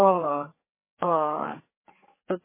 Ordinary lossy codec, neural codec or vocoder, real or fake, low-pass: MP3, 16 kbps; codec, 16 kHz, 4 kbps, FreqCodec, larger model; fake; 3.6 kHz